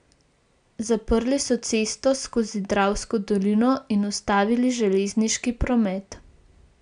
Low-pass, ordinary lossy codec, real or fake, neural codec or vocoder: 9.9 kHz; none; real; none